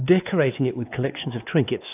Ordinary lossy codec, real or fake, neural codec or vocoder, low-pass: AAC, 32 kbps; fake; codec, 16 kHz, 2 kbps, X-Codec, WavLM features, trained on Multilingual LibriSpeech; 3.6 kHz